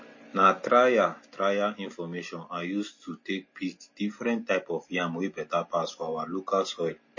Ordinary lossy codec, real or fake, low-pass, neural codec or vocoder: MP3, 32 kbps; real; 7.2 kHz; none